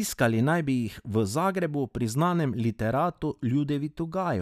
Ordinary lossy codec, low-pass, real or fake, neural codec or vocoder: none; 14.4 kHz; real; none